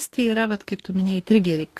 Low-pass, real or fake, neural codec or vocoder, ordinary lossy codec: 14.4 kHz; fake; codec, 44.1 kHz, 2.6 kbps, DAC; AAC, 64 kbps